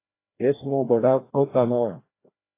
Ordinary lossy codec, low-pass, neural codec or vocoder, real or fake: AAC, 24 kbps; 3.6 kHz; codec, 16 kHz, 1 kbps, FreqCodec, larger model; fake